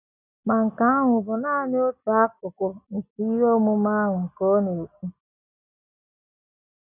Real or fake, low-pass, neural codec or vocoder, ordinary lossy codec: real; 3.6 kHz; none; none